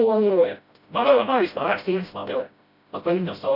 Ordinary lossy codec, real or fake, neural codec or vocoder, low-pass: none; fake; codec, 16 kHz, 0.5 kbps, FreqCodec, smaller model; 5.4 kHz